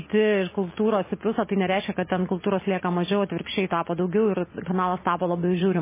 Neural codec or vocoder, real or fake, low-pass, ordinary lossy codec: none; real; 3.6 kHz; MP3, 16 kbps